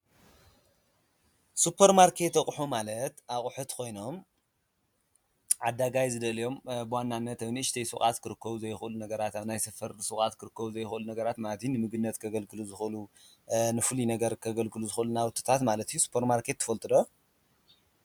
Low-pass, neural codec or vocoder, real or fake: 19.8 kHz; none; real